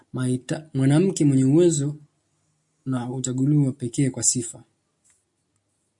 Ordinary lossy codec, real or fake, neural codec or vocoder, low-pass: MP3, 64 kbps; real; none; 10.8 kHz